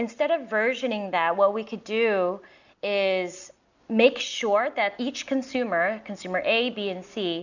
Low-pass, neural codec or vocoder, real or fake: 7.2 kHz; none; real